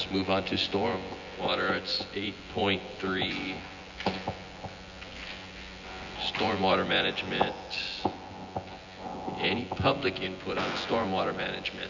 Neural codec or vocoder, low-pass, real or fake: vocoder, 24 kHz, 100 mel bands, Vocos; 7.2 kHz; fake